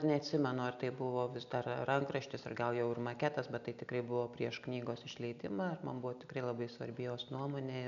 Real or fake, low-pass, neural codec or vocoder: real; 7.2 kHz; none